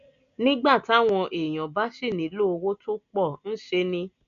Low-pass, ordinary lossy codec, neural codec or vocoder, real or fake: 7.2 kHz; none; none; real